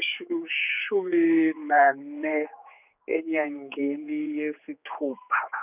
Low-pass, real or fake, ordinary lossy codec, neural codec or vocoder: 3.6 kHz; fake; none; codec, 16 kHz, 4 kbps, X-Codec, HuBERT features, trained on general audio